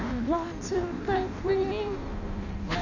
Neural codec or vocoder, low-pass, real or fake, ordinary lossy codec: codec, 16 kHz in and 24 kHz out, 0.6 kbps, FireRedTTS-2 codec; 7.2 kHz; fake; none